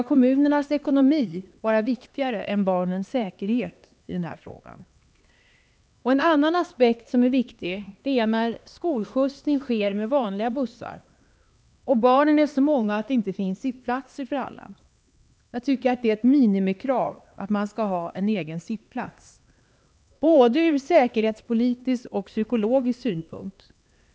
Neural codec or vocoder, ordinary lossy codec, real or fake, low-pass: codec, 16 kHz, 2 kbps, X-Codec, HuBERT features, trained on LibriSpeech; none; fake; none